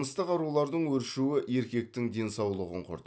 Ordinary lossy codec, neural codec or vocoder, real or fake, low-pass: none; none; real; none